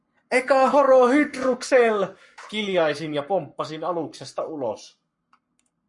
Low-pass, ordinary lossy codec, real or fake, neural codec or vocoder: 10.8 kHz; MP3, 48 kbps; fake; codec, 44.1 kHz, 7.8 kbps, Pupu-Codec